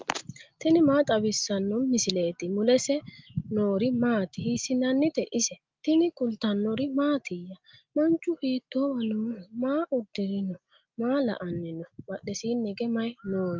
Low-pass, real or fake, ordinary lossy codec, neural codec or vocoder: 7.2 kHz; real; Opus, 24 kbps; none